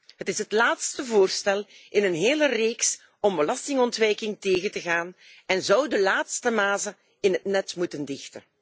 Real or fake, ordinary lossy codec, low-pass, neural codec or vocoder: real; none; none; none